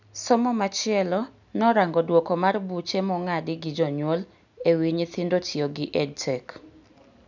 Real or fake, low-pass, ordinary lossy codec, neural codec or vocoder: real; 7.2 kHz; none; none